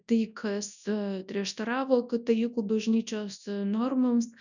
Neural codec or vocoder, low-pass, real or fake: codec, 24 kHz, 0.9 kbps, WavTokenizer, large speech release; 7.2 kHz; fake